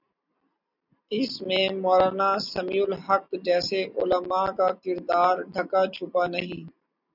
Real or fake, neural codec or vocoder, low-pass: real; none; 5.4 kHz